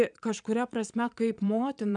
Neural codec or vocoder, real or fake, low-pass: vocoder, 22.05 kHz, 80 mel bands, Vocos; fake; 9.9 kHz